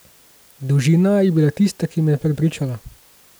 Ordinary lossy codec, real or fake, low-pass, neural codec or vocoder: none; real; none; none